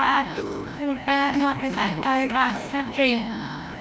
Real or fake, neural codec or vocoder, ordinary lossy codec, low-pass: fake; codec, 16 kHz, 0.5 kbps, FreqCodec, larger model; none; none